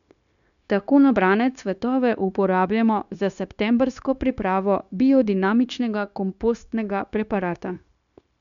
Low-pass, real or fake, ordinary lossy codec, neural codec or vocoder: 7.2 kHz; fake; MP3, 96 kbps; codec, 16 kHz, 0.9 kbps, LongCat-Audio-Codec